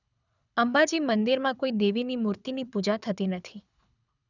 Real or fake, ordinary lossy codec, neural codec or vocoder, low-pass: fake; none; codec, 24 kHz, 6 kbps, HILCodec; 7.2 kHz